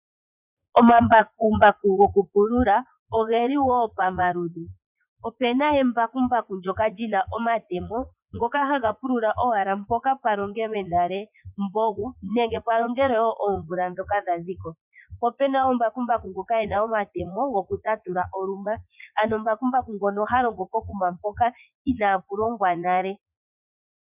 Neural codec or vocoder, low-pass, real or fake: vocoder, 44.1 kHz, 80 mel bands, Vocos; 3.6 kHz; fake